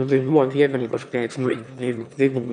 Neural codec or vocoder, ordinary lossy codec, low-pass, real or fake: autoencoder, 22.05 kHz, a latent of 192 numbers a frame, VITS, trained on one speaker; MP3, 96 kbps; 9.9 kHz; fake